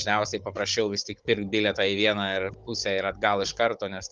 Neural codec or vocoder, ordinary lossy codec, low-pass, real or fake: codec, 16 kHz, 16 kbps, FunCodec, trained on Chinese and English, 50 frames a second; Opus, 32 kbps; 7.2 kHz; fake